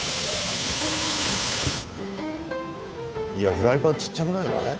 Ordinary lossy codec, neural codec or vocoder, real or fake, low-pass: none; codec, 16 kHz, 2 kbps, FunCodec, trained on Chinese and English, 25 frames a second; fake; none